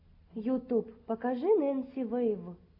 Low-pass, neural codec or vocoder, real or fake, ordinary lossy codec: 5.4 kHz; none; real; AAC, 32 kbps